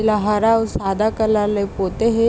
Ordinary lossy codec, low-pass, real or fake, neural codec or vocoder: none; none; real; none